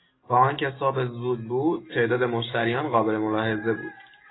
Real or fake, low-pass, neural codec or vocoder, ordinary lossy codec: real; 7.2 kHz; none; AAC, 16 kbps